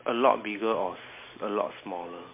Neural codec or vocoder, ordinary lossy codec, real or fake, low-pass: none; MP3, 24 kbps; real; 3.6 kHz